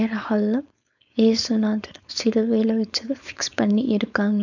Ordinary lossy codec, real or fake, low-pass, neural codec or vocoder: none; fake; 7.2 kHz; codec, 16 kHz, 4.8 kbps, FACodec